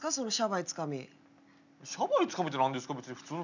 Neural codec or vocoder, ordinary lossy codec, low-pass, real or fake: none; none; 7.2 kHz; real